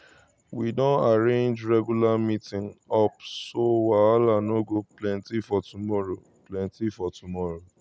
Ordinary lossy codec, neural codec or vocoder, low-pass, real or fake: none; none; none; real